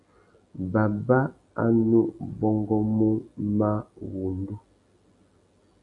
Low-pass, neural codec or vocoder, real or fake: 10.8 kHz; none; real